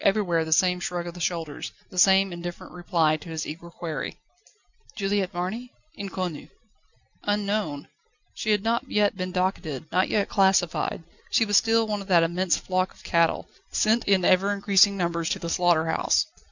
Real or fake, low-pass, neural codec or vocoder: real; 7.2 kHz; none